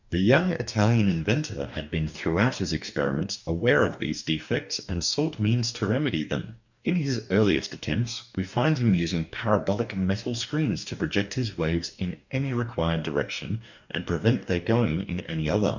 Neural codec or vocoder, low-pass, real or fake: codec, 44.1 kHz, 2.6 kbps, DAC; 7.2 kHz; fake